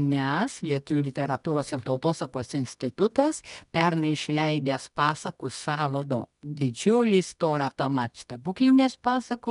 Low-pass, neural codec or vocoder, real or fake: 10.8 kHz; codec, 24 kHz, 0.9 kbps, WavTokenizer, medium music audio release; fake